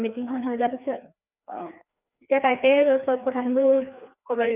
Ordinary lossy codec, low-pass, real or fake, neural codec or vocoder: none; 3.6 kHz; fake; codec, 16 kHz, 2 kbps, FreqCodec, larger model